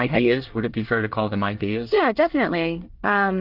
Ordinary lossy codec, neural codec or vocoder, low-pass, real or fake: Opus, 32 kbps; codec, 24 kHz, 1 kbps, SNAC; 5.4 kHz; fake